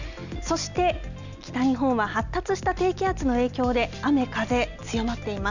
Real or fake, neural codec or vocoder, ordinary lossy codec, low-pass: real; none; none; 7.2 kHz